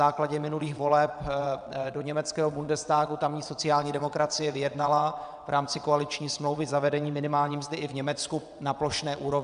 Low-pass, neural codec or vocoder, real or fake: 9.9 kHz; vocoder, 22.05 kHz, 80 mel bands, WaveNeXt; fake